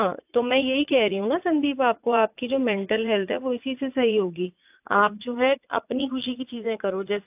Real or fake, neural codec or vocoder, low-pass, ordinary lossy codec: fake; vocoder, 44.1 kHz, 80 mel bands, Vocos; 3.6 kHz; AAC, 32 kbps